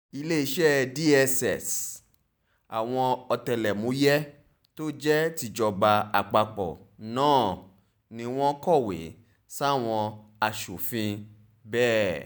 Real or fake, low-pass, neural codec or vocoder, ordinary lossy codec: real; none; none; none